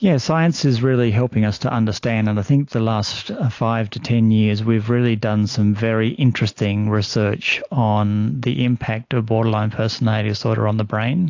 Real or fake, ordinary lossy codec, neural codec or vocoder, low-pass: real; AAC, 48 kbps; none; 7.2 kHz